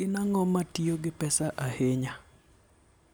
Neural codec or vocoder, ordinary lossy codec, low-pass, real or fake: none; none; none; real